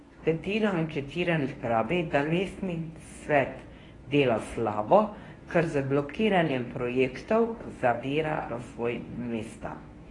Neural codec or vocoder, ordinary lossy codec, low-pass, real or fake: codec, 24 kHz, 0.9 kbps, WavTokenizer, medium speech release version 1; AAC, 32 kbps; 10.8 kHz; fake